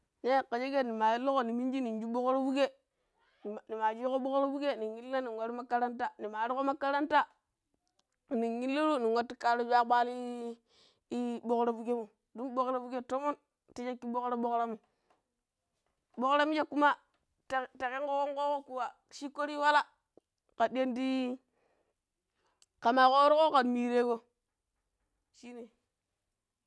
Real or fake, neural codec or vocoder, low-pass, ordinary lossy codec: real; none; none; none